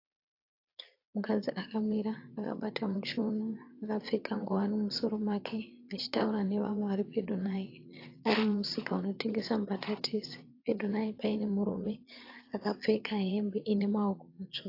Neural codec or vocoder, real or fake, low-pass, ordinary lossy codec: vocoder, 22.05 kHz, 80 mel bands, Vocos; fake; 5.4 kHz; AAC, 32 kbps